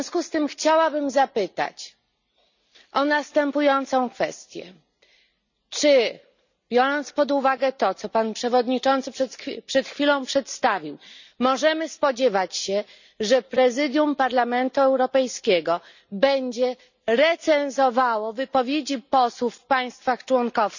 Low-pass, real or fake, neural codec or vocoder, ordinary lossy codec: 7.2 kHz; real; none; none